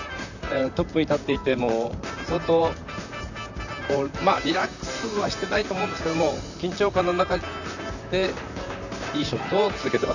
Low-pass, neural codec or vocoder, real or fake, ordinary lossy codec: 7.2 kHz; vocoder, 44.1 kHz, 128 mel bands, Pupu-Vocoder; fake; none